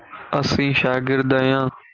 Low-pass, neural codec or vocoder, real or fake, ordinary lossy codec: 7.2 kHz; none; real; Opus, 32 kbps